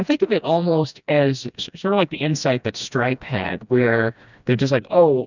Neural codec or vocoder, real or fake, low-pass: codec, 16 kHz, 1 kbps, FreqCodec, smaller model; fake; 7.2 kHz